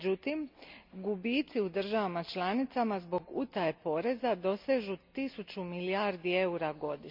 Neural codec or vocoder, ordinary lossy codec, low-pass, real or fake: none; MP3, 48 kbps; 5.4 kHz; real